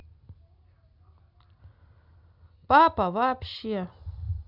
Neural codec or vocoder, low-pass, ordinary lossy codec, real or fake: none; 5.4 kHz; none; real